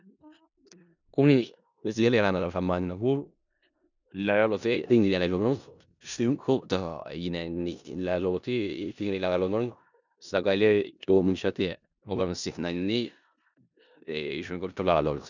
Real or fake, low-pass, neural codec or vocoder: fake; 7.2 kHz; codec, 16 kHz in and 24 kHz out, 0.4 kbps, LongCat-Audio-Codec, four codebook decoder